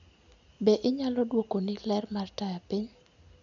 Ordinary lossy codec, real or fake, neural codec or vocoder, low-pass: none; real; none; 7.2 kHz